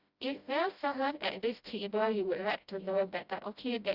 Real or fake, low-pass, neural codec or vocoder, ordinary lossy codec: fake; 5.4 kHz; codec, 16 kHz, 0.5 kbps, FreqCodec, smaller model; Opus, 64 kbps